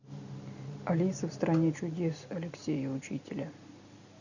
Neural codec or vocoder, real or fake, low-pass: none; real; 7.2 kHz